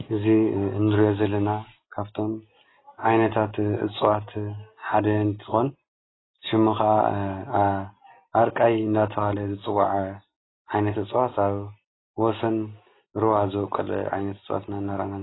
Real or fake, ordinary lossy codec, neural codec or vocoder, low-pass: real; AAC, 16 kbps; none; 7.2 kHz